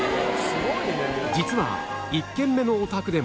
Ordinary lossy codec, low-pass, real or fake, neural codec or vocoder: none; none; real; none